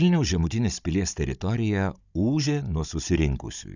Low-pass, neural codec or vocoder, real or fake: 7.2 kHz; codec, 16 kHz, 16 kbps, FunCodec, trained on Chinese and English, 50 frames a second; fake